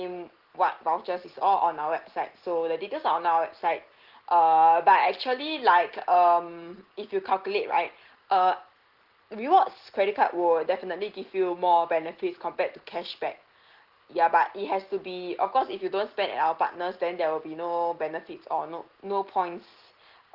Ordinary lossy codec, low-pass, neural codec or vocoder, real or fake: Opus, 16 kbps; 5.4 kHz; none; real